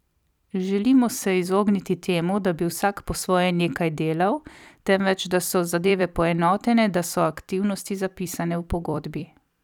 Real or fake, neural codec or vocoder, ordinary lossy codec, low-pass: fake; vocoder, 44.1 kHz, 128 mel bands every 256 samples, BigVGAN v2; none; 19.8 kHz